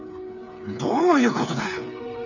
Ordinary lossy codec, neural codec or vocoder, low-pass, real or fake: none; codec, 16 kHz, 8 kbps, FreqCodec, smaller model; 7.2 kHz; fake